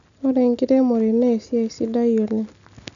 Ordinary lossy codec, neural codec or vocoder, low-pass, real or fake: none; none; 7.2 kHz; real